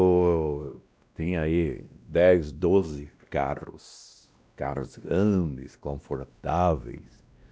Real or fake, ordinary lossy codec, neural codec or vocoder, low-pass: fake; none; codec, 16 kHz, 1 kbps, X-Codec, WavLM features, trained on Multilingual LibriSpeech; none